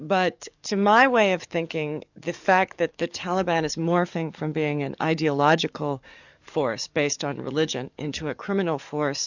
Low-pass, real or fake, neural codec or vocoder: 7.2 kHz; fake; codec, 44.1 kHz, 7.8 kbps, DAC